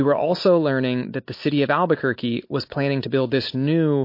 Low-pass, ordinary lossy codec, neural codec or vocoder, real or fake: 5.4 kHz; MP3, 32 kbps; none; real